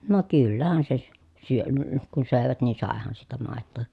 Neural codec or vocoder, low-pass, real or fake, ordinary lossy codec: none; none; real; none